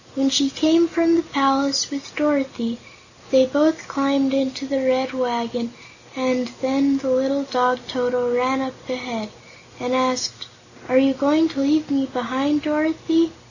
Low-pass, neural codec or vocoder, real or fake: 7.2 kHz; none; real